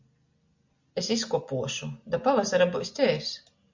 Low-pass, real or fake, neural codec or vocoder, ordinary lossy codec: 7.2 kHz; real; none; MP3, 64 kbps